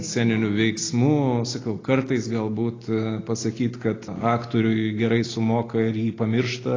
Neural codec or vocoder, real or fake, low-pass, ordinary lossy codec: none; real; 7.2 kHz; AAC, 32 kbps